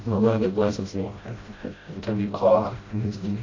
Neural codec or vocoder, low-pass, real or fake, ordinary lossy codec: codec, 16 kHz, 0.5 kbps, FreqCodec, smaller model; 7.2 kHz; fake; AAC, 32 kbps